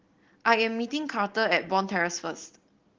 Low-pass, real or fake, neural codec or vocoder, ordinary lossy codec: 7.2 kHz; real; none; Opus, 16 kbps